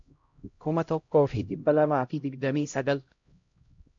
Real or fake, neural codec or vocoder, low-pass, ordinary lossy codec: fake; codec, 16 kHz, 0.5 kbps, X-Codec, HuBERT features, trained on LibriSpeech; 7.2 kHz; MP3, 48 kbps